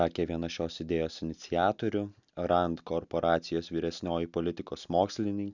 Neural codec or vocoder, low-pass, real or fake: none; 7.2 kHz; real